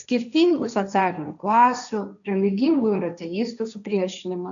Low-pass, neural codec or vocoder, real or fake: 7.2 kHz; codec, 16 kHz, 1.1 kbps, Voila-Tokenizer; fake